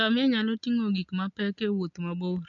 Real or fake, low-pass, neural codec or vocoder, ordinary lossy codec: real; 7.2 kHz; none; MP3, 64 kbps